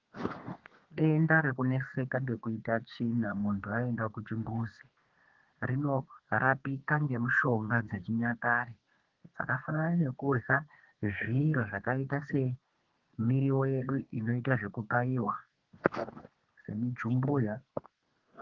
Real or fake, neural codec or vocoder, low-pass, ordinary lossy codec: fake; codec, 32 kHz, 1.9 kbps, SNAC; 7.2 kHz; Opus, 16 kbps